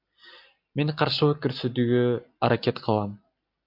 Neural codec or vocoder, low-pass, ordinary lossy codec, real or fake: none; 5.4 kHz; MP3, 48 kbps; real